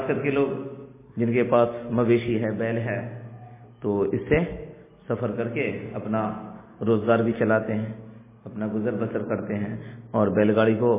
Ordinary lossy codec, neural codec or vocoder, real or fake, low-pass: MP3, 16 kbps; none; real; 3.6 kHz